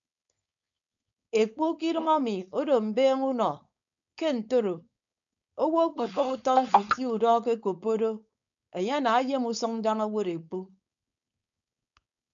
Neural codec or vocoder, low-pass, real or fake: codec, 16 kHz, 4.8 kbps, FACodec; 7.2 kHz; fake